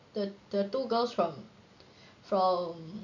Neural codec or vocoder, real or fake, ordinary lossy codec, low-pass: none; real; none; 7.2 kHz